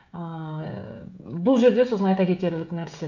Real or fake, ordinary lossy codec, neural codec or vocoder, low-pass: fake; none; codec, 16 kHz, 16 kbps, FreqCodec, smaller model; 7.2 kHz